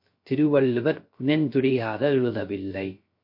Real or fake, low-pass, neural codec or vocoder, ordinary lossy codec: fake; 5.4 kHz; codec, 16 kHz, 0.3 kbps, FocalCodec; MP3, 32 kbps